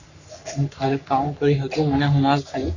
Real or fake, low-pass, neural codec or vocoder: fake; 7.2 kHz; codec, 44.1 kHz, 3.4 kbps, Pupu-Codec